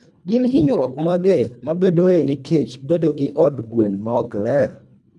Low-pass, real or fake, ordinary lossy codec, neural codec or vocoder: none; fake; none; codec, 24 kHz, 1.5 kbps, HILCodec